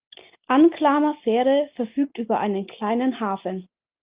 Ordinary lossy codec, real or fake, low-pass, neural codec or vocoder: Opus, 32 kbps; real; 3.6 kHz; none